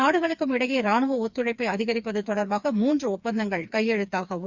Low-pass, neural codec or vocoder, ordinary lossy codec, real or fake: 7.2 kHz; codec, 16 kHz, 4 kbps, FreqCodec, smaller model; Opus, 64 kbps; fake